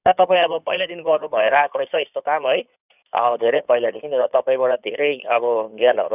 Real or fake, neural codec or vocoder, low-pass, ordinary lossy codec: fake; codec, 16 kHz in and 24 kHz out, 2.2 kbps, FireRedTTS-2 codec; 3.6 kHz; none